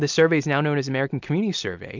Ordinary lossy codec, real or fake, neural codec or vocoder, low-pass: MP3, 64 kbps; real; none; 7.2 kHz